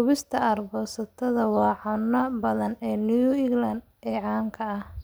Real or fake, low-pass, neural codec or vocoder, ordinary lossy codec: real; none; none; none